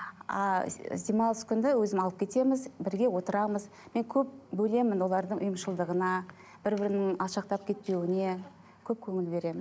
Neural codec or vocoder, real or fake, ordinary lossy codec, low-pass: none; real; none; none